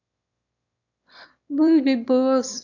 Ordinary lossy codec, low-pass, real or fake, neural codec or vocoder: none; 7.2 kHz; fake; autoencoder, 22.05 kHz, a latent of 192 numbers a frame, VITS, trained on one speaker